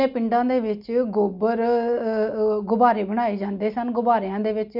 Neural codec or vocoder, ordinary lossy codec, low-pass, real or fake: none; none; 5.4 kHz; real